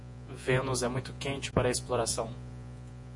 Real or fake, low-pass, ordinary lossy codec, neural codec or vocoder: fake; 10.8 kHz; MP3, 48 kbps; vocoder, 48 kHz, 128 mel bands, Vocos